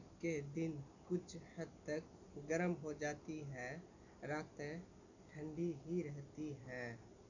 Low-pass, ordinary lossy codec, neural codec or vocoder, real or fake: 7.2 kHz; none; none; real